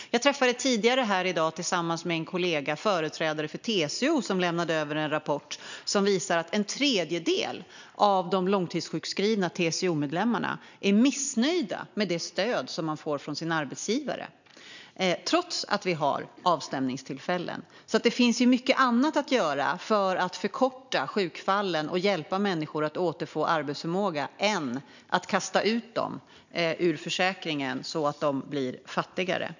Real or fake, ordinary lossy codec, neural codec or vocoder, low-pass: real; none; none; 7.2 kHz